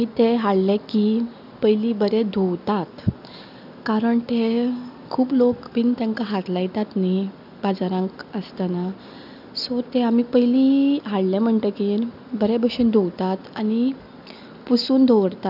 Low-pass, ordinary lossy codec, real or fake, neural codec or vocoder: 5.4 kHz; none; real; none